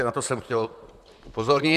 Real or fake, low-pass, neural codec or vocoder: fake; 14.4 kHz; vocoder, 44.1 kHz, 128 mel bands, Pupu-Vocoder